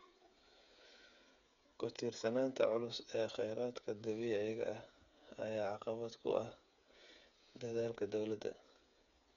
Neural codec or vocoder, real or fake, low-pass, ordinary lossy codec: codec, 16 kHz, 8 kbps, FreqCodec, smaller model; fake; 7.2 kHz; none